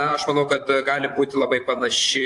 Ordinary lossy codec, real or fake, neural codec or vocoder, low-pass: AAC, 64 kbps; fake; vocoder, 44.1 kHz, 128 mel bands, Pupu-Vocoder; 10.8 kHz